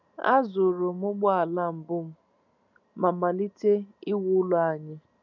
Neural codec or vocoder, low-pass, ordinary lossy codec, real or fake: none; 7.2 kHz; none; real